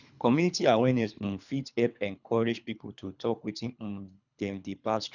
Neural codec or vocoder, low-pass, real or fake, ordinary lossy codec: codec, 24 kHz, 3 kbps, HILCodec; 7.2 kHz; fake; none